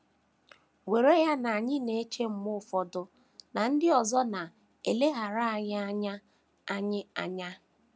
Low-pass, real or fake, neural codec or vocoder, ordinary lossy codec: none; real; none; none